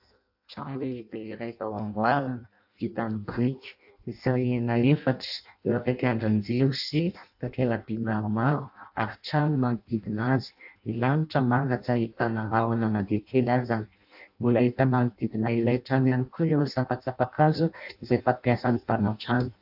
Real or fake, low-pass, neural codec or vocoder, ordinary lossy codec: fake; 5.4 kHz; codec, 16 kHz in and 24 kHz out, 0.6 kbps, FireRedTTS-2 codec; AAC, 48 kbps